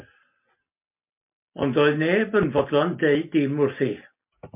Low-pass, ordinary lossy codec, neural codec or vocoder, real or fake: 3.6 kHz; MP3, 32 kbps; none; real